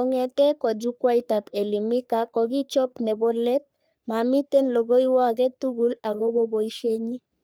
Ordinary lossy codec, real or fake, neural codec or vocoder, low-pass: none; fake; codec, 44.1 kHz, 3.4 kbps, Pupu-Codec; none